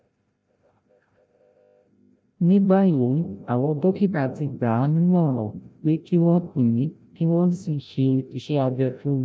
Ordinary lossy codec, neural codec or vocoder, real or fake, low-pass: none; codec, 16 kHz, 0.5 kbps, FreqCodec, larger model; fake; none